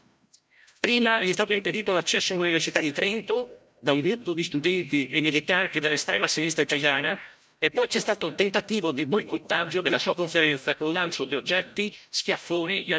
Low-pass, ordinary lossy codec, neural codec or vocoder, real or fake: none; none; codec, 16 kHz, 0.5 kbps, FreqCodec, larger model; fake